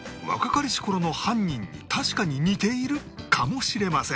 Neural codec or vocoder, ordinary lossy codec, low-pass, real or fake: none; none; none; real